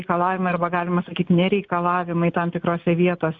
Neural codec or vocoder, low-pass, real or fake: none; 7.2 kHz; real